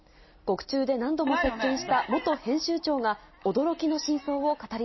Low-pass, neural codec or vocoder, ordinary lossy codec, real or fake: 7.2 kHz; none; MP3, 24 kbps; real